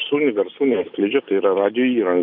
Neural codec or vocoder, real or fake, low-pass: none; real; 5.4 kHz